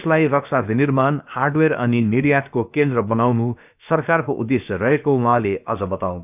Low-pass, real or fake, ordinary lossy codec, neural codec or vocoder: 3.6 kHz; fake; none; codec, 16 kHz, about 1 kbps, DyCAST, with the encoder's durations